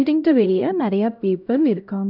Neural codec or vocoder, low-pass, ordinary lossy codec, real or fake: codec, 16 kHz, 0.5 kbps, X-Codec, HuBERT features, trained on LibriSpeech; 5.4 kHz; none; fake